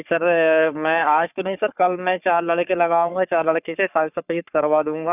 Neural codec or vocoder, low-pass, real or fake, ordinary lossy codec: codec, 44.1 kHz, 3.4 kbps, Pupu-Codec; 3.6 kHz; fake; none